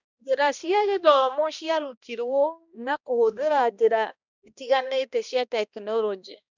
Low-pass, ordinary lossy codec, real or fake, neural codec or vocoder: 7.2 kHz; none; fake; codec, 16 kHz, 1 kbps, X-Codec, HuBERT features, trained on balanced general audio